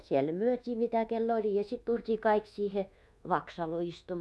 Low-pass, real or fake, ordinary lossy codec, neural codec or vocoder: none; fake; none; codec, 24 kHz, 1.2 kbps, DualCodec